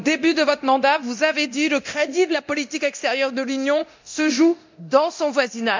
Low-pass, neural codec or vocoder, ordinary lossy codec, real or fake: 7.2 kHz; codec, 24 kHz, 0.9 kbps, DualCodec; MP3, 64 kbps; fake